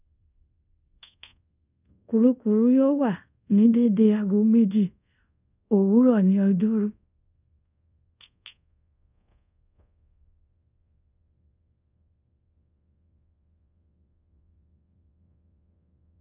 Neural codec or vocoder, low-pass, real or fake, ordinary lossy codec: codec, 24 kHz, 0.5 kbps, DualCodec; 3.6 kHz; fake; none